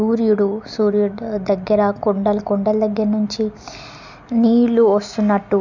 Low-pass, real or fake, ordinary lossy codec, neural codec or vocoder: 7.2 kHz; real; none; none